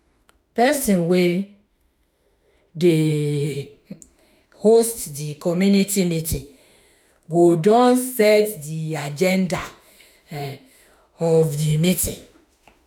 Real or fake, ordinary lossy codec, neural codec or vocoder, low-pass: fake; none; autoencoder, 48 kHz, 32 numbers a frame, DAC-VAE, trained on Japanese speech; none